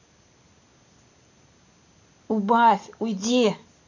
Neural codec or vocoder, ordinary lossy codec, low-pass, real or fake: none; none; 7.2 kHz; real